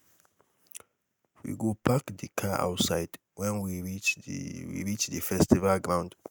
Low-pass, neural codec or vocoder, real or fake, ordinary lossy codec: none; none; real; none